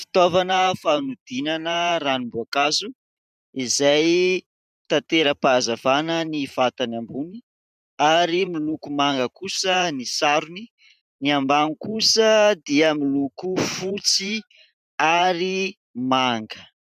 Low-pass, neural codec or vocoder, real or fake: 14.4 kHz; vocoder, 44.1 kHz, 128 mel bands every 512 samples, BigVGAN v2; fake